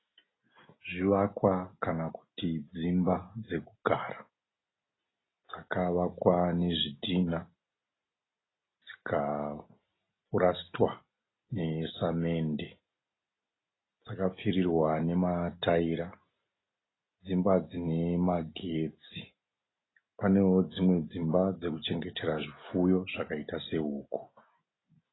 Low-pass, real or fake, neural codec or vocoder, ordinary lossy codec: 7.2 kHz; real; none; AAC, 16 kbps